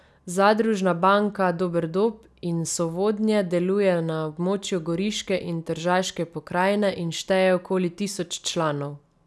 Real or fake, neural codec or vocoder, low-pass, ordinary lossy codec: real; none; none; none